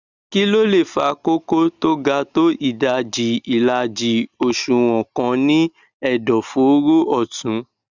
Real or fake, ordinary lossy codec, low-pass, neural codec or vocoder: real; Opus, 64 kbps; 7.2 kHz; none